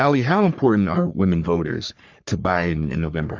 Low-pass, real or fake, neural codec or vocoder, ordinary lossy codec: 7.2 kHz; fake; codec, 44.1 kHz, 3.4 kbps, Pupu-Codec; Opus, 64 kbps